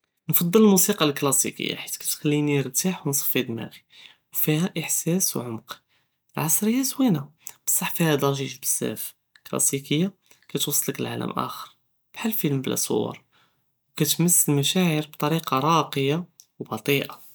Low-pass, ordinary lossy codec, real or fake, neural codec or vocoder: none; none; real; none